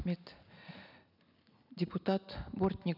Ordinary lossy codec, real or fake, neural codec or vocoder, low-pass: none; real; none; 5.4 kHz